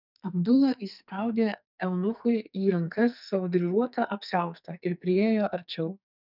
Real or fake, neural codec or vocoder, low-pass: fake; codec, 44.1 kHz, 2.6 kbps, SNAC; 5.4 kHz